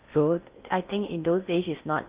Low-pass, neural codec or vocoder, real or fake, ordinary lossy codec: 3.6 kHz; codec, 16 kHz in and 24 kHz out, 0.6 kbps, FocalCodec, streaming, 4096 codes; fake; Opus, 24 kbps